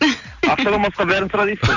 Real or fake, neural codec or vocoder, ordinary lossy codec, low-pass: real; none; none; 7.2 kHz